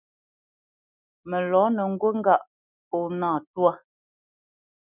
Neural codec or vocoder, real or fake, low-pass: none; real; 3.6 kHz